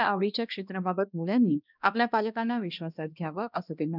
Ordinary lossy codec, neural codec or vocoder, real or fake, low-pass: none; codec, 16 kHz, 1 kbps, X-Codec, HuBERT features, trained on balanced general audio; fake; 5.4 kHz